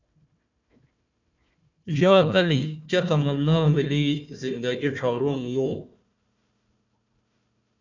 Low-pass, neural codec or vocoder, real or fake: 7.2 kHz; codec, 16 kHz, 1 kbps, FunCodec, trained on Chinese and English, 50 frames a second; fake